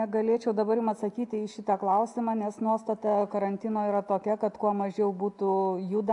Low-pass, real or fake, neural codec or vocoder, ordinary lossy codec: 10.8 kHz; real; none; AAC, 64 kbps